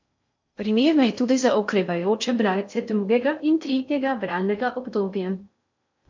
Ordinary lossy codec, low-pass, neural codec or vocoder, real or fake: MP3, 48 kbps; 7.2 kHz; codec, 16 kHz in and 24 kHz out, 0.6 kbps, FocalCodec, streaming, 4096 codes; fake